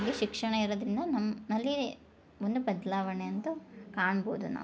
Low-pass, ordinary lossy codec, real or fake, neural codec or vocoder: none; none; real; none